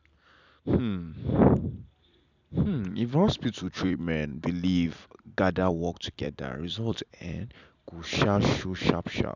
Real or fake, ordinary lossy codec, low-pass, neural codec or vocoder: fake; none; 7.2 kHz; vocoder, 44.1 kHz, 128 mel bands every 256 samples, BigVGAN v2